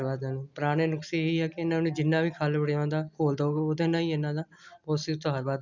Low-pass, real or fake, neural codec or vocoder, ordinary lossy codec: 7.2 kHz; real; none; none